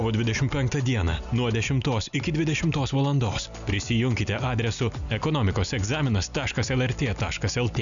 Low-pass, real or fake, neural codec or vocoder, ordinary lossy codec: 7.2 kHz; real; none; MP3, 96 kbps